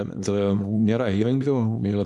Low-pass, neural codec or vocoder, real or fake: 10.8 kHz; codec, 24 kHz, 0.9 kbps, WavTokenizer, small release; fake